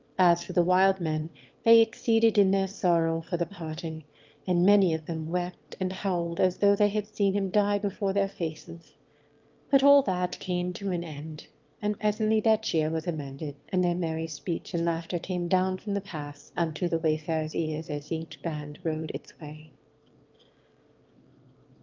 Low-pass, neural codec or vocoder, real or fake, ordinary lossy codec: 7.2 kHz; autoencoder, 22.05 kHz, a latent of 192 numbers a frame, VITS, trained on one speaker; fake; Opus, 32 kbps